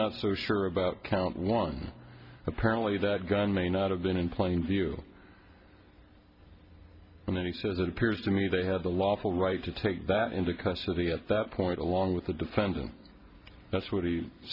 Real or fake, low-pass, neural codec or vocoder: real; 5.4 kHz; none